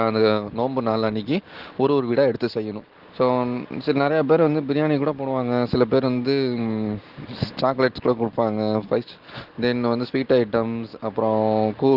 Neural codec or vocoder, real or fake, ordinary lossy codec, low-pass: none; real; Opus, 16 kbps; 5.4 kHz